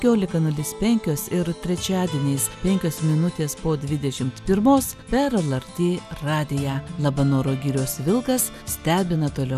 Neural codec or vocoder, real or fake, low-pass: none; real; 14.4 kHz